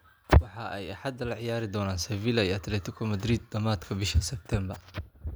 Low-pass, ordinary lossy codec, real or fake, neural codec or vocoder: none; none; real; none